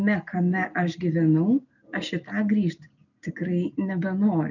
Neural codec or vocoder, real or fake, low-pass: none; real; 7.2 kHz